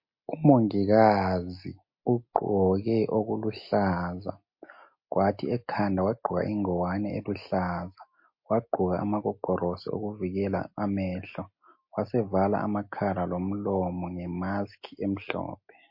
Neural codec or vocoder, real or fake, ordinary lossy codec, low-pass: none; real; MP3, 32 kbps; 5.4 kHz